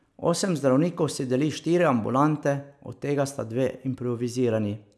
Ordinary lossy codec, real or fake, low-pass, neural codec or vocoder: none; real; none; none